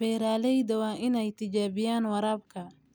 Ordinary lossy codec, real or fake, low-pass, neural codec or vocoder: none; real; none; none